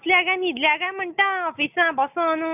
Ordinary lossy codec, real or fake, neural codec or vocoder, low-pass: none; real; none; 3.6 kHz